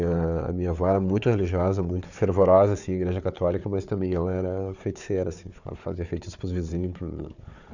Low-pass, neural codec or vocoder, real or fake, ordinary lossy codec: 7.2 kHz; codec, 16 kHz, 4 kbps, FunCodec, trained on Chinese and English, 50 frames a second; fake; none